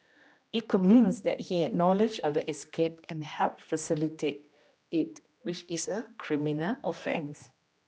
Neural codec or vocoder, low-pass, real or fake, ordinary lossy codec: codec, 16 kHz, 1 kbps, X-Codec, HuBERT features, trained on general audio; none; fake; none